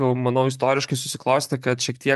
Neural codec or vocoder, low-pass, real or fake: vocoder, 44.1 kHz, 128 mel bands, Pupu-Vocoder; 14.4 kHz; fake